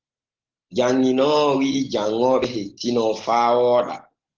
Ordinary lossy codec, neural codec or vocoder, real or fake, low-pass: Opus, 16 kbps; none; real; 7.2 kHz